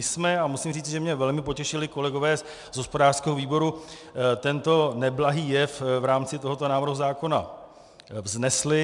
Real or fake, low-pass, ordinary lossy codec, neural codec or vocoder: real; 10.8 kHz; MP3, 96 kbps; none